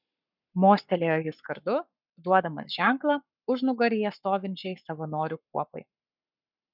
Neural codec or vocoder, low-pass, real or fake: codec, 44.1 kHz, 7.8 kbps, Pupu-Codec; 5.4 kHz; fake